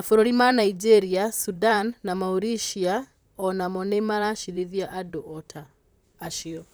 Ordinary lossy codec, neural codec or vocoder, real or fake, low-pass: none; vocoder, 44.1 kHz, 128 mel bands, Pupu-Vocoder; fake; none